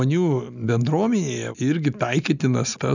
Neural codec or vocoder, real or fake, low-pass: none; real; 7.2 kHz